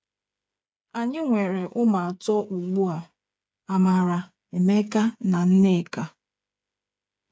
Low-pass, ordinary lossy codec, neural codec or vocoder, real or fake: none; none; codec, 16 kHz, 8 kbps, FreqCodec, smaller model; fake